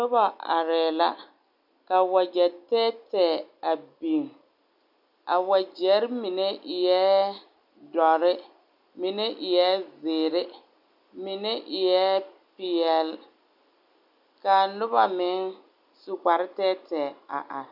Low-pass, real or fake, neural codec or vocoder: 5.4 kHz; real; none